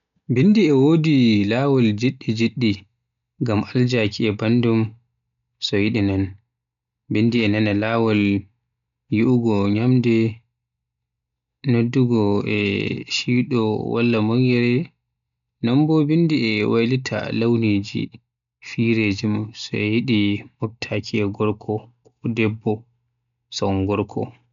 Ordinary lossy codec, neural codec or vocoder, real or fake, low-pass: none; none; real; 7.2 kHz